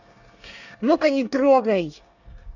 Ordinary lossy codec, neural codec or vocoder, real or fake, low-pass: none; codec, 24 kHz, 1 kbps, SNAC; fake; 7.2 kHz